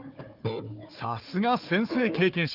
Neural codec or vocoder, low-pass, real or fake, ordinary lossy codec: codec, 16 kHz, 4 kbps, FunCodec, trained on Chinese and English, 50 frames a second; 5.4 kHz; fake; Opus, 32 kbps